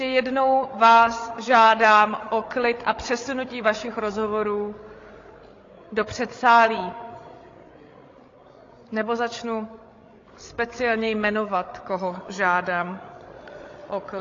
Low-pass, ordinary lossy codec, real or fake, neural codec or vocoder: 7.2 kHz; AAC, 32 kbps; fake; codec, 16 kHz, 16 kbps, FreqCodec, larger model